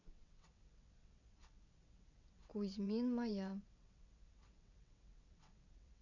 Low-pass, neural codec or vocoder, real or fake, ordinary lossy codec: 7.2 kHz; vocoder, 22.05 kHz, 80 mel bands, WaveNeXt; fake; none